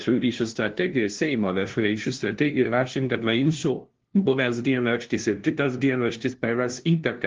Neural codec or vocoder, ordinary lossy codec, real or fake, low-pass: codec, 16 kHz, 0.5 kbps, FunCodec, trained on LibriTTS, 25 frames a second; Opus, 16 kbps; fake; 7.2 kHz